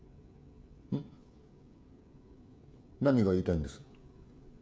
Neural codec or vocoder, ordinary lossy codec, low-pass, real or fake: codec, 16 kHz, 16 kbps, FreqCodec, smaller model; none; none; fake